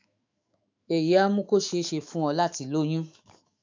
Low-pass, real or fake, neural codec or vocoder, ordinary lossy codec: 7.2 kHz; fake; autoencoder, 48 kHz, 128 numbers a frame, DAC-VAE, trained on Japanese speech; MP3, 64 kbps